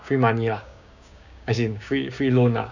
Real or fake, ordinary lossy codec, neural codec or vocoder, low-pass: fake; none; codec, 16 kHz, 6 kbps, DAC; 7.2 kHz